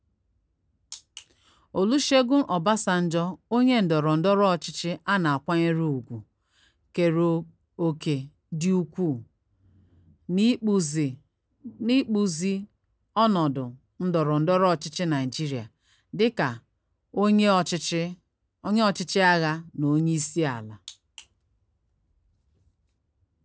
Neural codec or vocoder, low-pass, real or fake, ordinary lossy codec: none; none; real; none